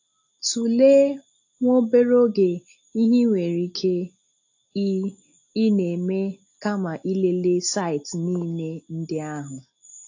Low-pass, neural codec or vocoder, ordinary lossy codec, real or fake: 7.2 kHz; none; AAC, 48 kbps; real